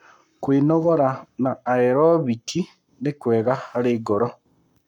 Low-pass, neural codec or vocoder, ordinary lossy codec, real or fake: 19.8 kHz; codec, 44.1 kHz, 7.8 kbps, Pupu-Codec; none; fake